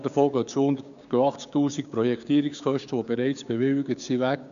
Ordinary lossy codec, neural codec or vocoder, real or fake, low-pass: none; codec, 16 kHz, 6 kbps, DAC; fake; 7.2 kHz